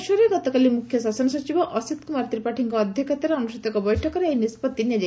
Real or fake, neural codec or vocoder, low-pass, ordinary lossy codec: real; none; none; none